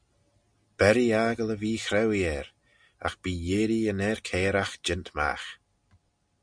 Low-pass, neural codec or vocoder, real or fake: 9.9 kHz; none; real